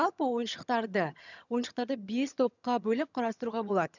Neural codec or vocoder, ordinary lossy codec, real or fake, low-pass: vocoder, 22.05 kHz, 80 mel bands, HiFi-GAN; none; fake; 7.2 kHz